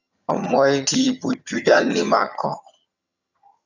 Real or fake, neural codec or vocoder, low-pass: fake; vocoder, 22.05 kHz, 80 mel bands, HiFi-GAN; 7.2 kHz